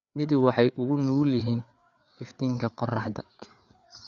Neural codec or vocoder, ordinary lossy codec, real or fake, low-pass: codec, 16 kHz, 4 kbps, FreqCodec, larger model; none; fake; 7.2 kHz